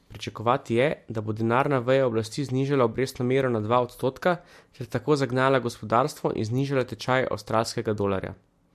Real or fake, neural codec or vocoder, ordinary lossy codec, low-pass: real; none; MP3, 64 kbps; 14.4 kHz